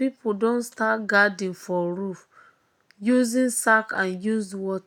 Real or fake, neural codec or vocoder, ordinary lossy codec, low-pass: real; none; none; 19.8 kHz